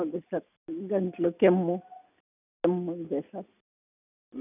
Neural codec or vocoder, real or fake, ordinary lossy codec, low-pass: none; real; none; 3.6 kHz